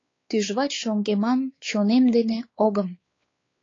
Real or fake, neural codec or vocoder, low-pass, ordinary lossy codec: fake; codec, 16 kHz, 4 kbps, X-Codec, WavLM features, trained on Multilingual LibriSpeech; 7.2 kHz; AAC, 32 kbps